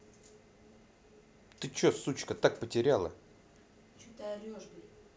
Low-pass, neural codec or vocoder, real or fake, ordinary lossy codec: none; none; real; none